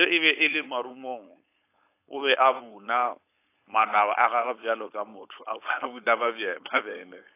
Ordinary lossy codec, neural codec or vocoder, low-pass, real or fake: AAC, 24 kbps; codec, 16 kHz, 4.8 kbps, FACodec; 3.6 kHz; fake